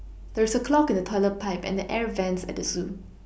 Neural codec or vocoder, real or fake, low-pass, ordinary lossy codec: none; real; none; none